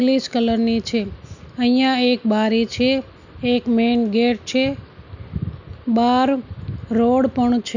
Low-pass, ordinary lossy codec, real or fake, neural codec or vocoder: 7.2 kHz; none; real; none